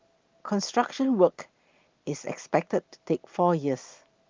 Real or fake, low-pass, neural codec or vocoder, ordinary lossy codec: real; 7.2 kHz; none; Opus, 32 kbps